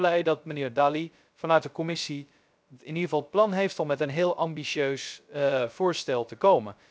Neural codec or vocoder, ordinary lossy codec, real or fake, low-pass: codec, 16 kHz, 0.3 kbps, FocalCodec; none; fake; none